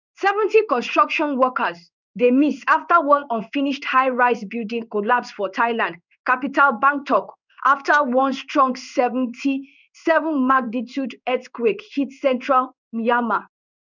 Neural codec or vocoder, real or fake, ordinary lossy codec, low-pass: codec, 16 kHz in and 24 kHz out, 1 kbps, XY-Tokenizer; fake; none; 7.2 kHz